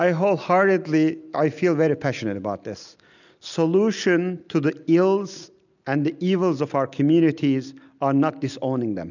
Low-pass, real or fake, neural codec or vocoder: 7.2 kHz; real; none